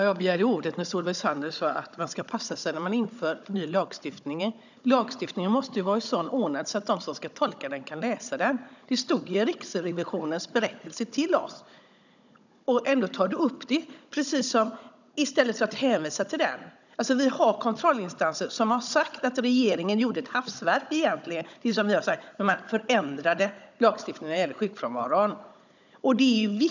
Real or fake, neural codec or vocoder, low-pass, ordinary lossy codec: fake; codec, 16 kHz, 16 kbps, FunCodec, trained on Chinese and English, 50 frames a second; 7.2 kHz; none